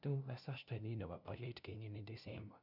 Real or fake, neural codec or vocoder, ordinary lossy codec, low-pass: fake; codec, 16 kHz, 0.5 kbps, FunCodec, trained on LibriTTS, 25 frames a second; none; 5.4 kHz